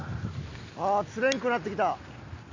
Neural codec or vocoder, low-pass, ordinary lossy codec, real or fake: none; 7.2 kHz; AAC, 48 kbps; real